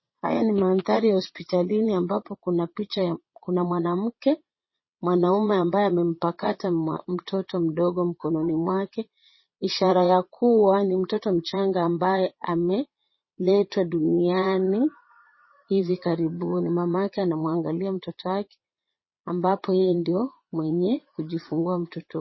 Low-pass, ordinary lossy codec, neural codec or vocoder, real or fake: 7.2 kHz; MP3, 24 kbps; vocoder, 22.05 kHz, 80 mel bands, Vocos; fake